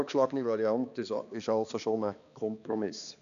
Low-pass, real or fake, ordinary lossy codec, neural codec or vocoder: 7.2 kHz; fake; none; codec, 16 kHz, 2 kbps, X-Codec, HuBERT features, trained on balanced general audio